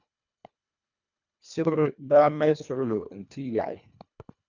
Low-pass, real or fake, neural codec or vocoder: 7.2 kHz; fake; codec, 24 kHz, 1.5 kbps, HILCodec